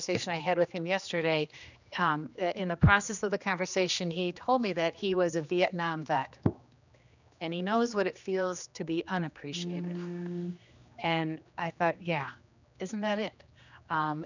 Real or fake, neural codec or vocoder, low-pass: fake; codec, 16 kHz, 2 kbps, X-Codec, HuBERT features, trained on general audio; 7.2 kHz